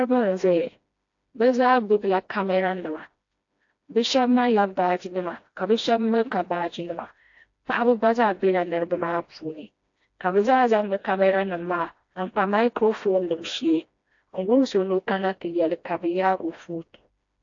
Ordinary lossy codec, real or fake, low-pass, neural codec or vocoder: AAC, 48 kbps; fake; 7.2 kHz; codec, 16 kHz, 1 kbps, FreqCodec, smaller model